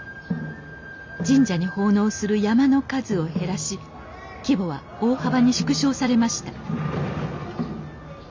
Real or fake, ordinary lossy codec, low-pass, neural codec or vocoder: real; MP3, 64 kbps; 7.2 kHz; none